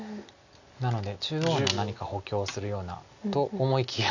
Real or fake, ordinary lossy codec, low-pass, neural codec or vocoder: real; none; 7.2 kHz; none